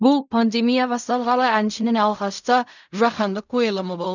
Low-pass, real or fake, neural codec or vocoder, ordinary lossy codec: 7.2 kHz; fake; codec, 16 kHz in and 24 kHz out, 0.4 kbps, LongCat-Audio-Codec, fine tuned four codebook decoder; none